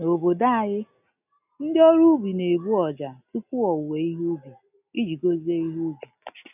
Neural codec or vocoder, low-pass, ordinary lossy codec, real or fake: none; 3.6 kHz; none; real